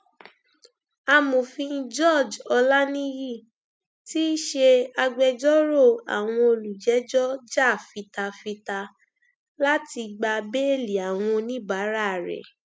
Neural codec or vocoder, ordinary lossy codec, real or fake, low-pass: none; none; real; none